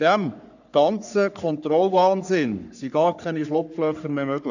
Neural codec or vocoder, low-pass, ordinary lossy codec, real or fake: codec, 44.1 kHz, 3.4 kbps, Pupu-Codec; 7.2 kHz; none; fake